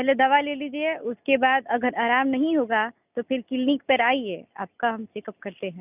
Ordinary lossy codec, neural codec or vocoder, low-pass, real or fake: none; none; 3.6 kHz; real